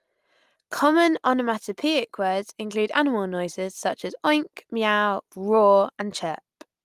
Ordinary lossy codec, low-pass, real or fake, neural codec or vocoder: Opus, 32 kbps; 14.4 kHz; real; none